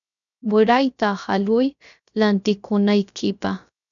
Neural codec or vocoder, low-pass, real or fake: codec, 16 kHz, 0.3 kbps, FocalCodec; 7.2 kHz; fake